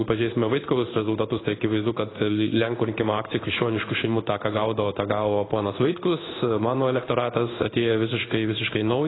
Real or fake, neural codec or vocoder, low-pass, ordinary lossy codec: fake; codec, 16 kHz in and 24 kHz out, 1 kbps, XY-Tokenizer; 7.2 kHz; AAC, 16 kbps